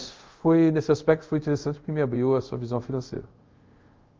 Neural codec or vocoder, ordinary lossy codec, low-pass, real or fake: codec, 24 kHz, 0.5 kbps, DualCodec; Opus, 32 kbps; 7.2 kHz; fake